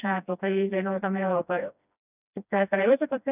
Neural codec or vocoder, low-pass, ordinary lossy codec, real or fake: codec, 16 kHz, 1 kbps, FreqCodec, smaller model; 3.6 kHz; none; fake